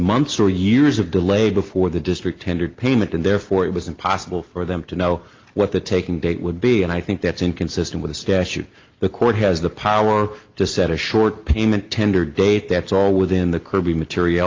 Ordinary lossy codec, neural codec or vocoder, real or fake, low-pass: Opus, 32 kbps; none; real; 7.2 kHz